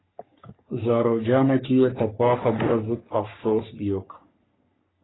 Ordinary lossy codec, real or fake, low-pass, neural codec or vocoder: AAC, 16 kbps; fake; 7.2 kHz; codec, 44.1 kHz, 3.4 kbps, Pupu-Codec